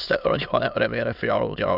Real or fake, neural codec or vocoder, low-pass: fake; autoencoder, 22.05 kHz, a latent of 192 numbers a frame, VITS, trained on many speakers; 5.4 kHz